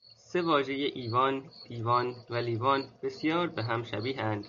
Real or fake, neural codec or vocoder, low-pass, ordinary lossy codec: real; none; 7.2 kHz; MP3, 96 kbps